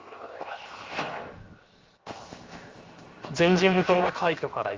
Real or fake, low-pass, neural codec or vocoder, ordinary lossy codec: fake; 7.2 kHz; codec, 16 kHz, 0.7 kbps, FocalCodec; Opus, 32 kbps